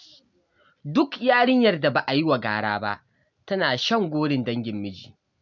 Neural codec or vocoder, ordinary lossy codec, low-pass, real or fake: none; none; 7.2 kHz; real